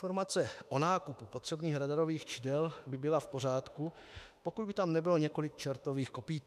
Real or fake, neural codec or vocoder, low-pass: fake; autoencoder, 48 kHz, 32 numbers a frame, DAC-VAE, trained on Japanese speech; 14.4 kHz